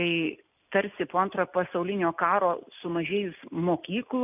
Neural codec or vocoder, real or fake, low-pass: none; real; 3.6 kHz